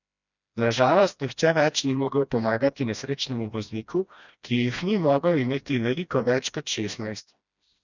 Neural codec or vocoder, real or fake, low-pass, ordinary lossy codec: codec, 16 kHz, 1 kbps, FreqCodec, smaller model; fake; 7.2 kHz; none